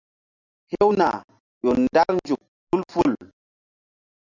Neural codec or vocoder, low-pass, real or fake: none; 7.2 kHz; real